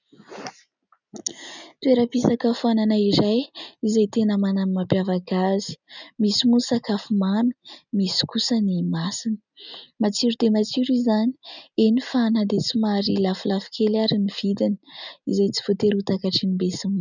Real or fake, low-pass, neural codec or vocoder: real; 7.2 kHz; none